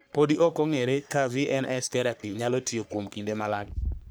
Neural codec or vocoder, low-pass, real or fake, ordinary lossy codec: codec, 44.1 kHz, 3.4 kbps, Pupu-Codec; none; fake; none